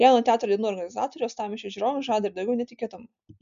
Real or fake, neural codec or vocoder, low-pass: real; none; 7.2 kHz